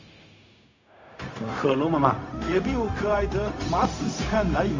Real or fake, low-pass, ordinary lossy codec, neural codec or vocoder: fake; 7.2 kHz; AAC, 48 kbps; codec, 16 kHz, 0.4 kbps, LongCat-Audio-Codec